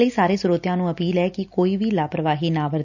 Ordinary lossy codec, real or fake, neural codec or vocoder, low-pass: none; real; none; 7.2 kHz